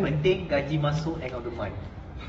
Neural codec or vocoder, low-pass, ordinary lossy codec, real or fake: vocoder, 44.1 kHz, 128 mel bands every 512 samples, BigVGAN v2; 19.8 kHz; AAC, 24 kbps; fake